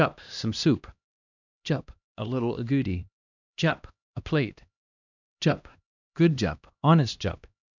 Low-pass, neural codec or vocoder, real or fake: 7.2 kHz; codec, 16 kHz, 1 kbps, X-Codec, HuBERT features, trained on LibriSpeech; fake